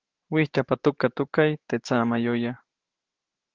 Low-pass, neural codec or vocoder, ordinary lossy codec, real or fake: 7.2 kHz; autoencoder, 48 kHz, 128 numbers a frame, DAC-VAE, trained on Japanese speech; Opus, 16 kbps; fake